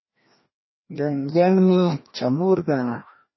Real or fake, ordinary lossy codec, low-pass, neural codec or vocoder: fake; MP3, 24 kbps; 7.2 kHz; codec, 16 kHz, 1 kbps, FreqCodec, larger model